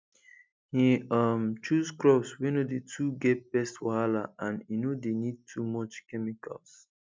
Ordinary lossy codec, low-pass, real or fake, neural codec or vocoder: none; none; real; none